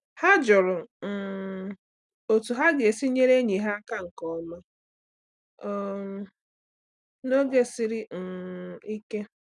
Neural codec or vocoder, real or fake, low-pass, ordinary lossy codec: none; real; 10.8 kHz; none